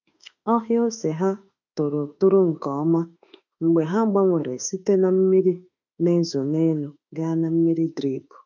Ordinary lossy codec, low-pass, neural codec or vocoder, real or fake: none; 7.2 kHz; autoencoder, 48 kHz, 32 numbers a frame, DAC-VAE, trained on Japanese speech; fake